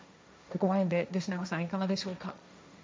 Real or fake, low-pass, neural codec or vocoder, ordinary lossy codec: fake; none; codec, 16 kHz, 1.1 kbps, Voila-Tokenizer; none